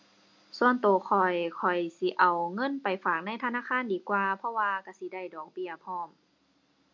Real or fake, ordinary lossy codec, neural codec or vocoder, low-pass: real; MP3, 48 kbps; none; 7.2 kHz